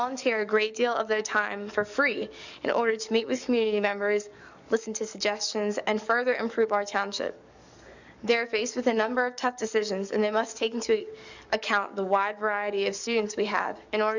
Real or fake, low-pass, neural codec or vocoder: fake; 7.2 kHz; codec, 44.1 kHz, 7.8 kbps, DAC